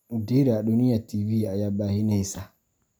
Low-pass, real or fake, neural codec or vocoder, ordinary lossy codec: none; real; none; none